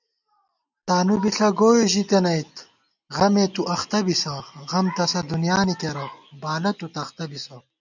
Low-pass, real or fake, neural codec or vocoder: 7.2 kHz; real; none